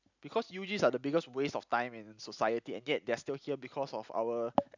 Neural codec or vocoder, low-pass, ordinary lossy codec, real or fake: none; 7.2 kHz; none; real